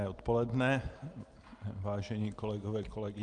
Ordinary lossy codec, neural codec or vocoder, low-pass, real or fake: Opus, 32 kbps; none; 9.9 kHz; real